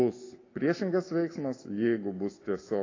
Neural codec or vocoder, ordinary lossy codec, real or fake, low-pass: none; AAC, 32 kbps; real; 7.2 kHz